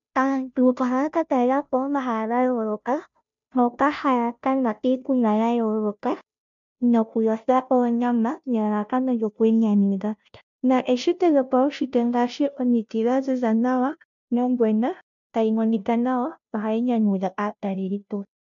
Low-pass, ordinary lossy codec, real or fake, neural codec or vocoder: 7.2 kHz; MP3, 64 kbps; fake; codec, 16 kHz, 0.5 kbps, FunCodec, trained on Chinese and English, 25 frames a second